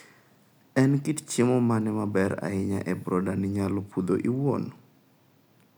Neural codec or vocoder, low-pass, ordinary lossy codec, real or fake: none; none; none; real